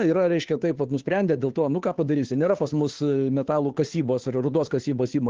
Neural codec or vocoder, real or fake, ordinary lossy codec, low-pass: codec, 16 kHz, 2 kbps, FunCodec, trained on Chinese and English, 25 frames a second; fake; Opus, 16 kbps; 7.2 kHz